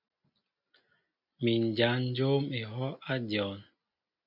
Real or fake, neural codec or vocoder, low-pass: real; none; 5.4 kHz